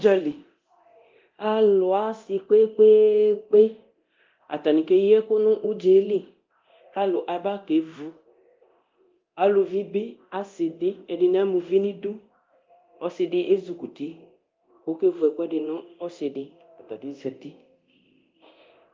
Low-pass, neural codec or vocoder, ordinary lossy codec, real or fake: 7.2 kHz; codec, 24 kHz, 0.9 kbps, DualCodec; Opus, 32 kbps; fake